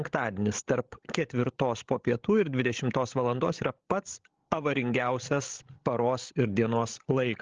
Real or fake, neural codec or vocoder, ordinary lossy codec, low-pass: fake; codec, 16 kHz, 16 kbps, FreqCodec, larger model; Opus, 16 kbps; 7.2 kHz